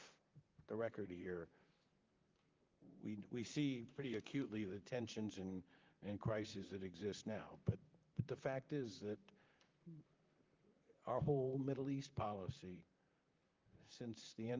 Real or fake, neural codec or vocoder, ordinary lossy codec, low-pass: fake; vocoder, 44.1 kHz, 128 mel bands, Pupu-Vocoder; Opus, 24 kbps; 7.2 kHz